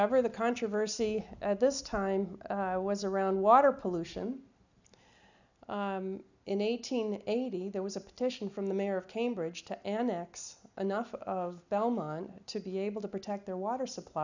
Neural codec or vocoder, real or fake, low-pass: none; real; 7.2 kHz